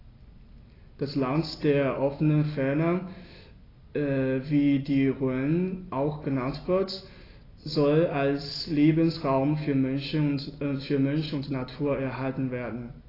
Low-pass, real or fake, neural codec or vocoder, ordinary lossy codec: 5.4 kHz; real; none; AAC, 24 kbps